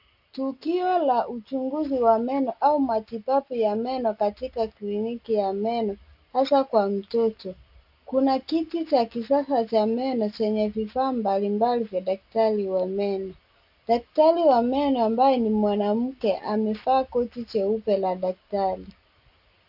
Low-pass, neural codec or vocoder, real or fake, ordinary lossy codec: 5.4 kHz; none; real; AAC, 48 kbps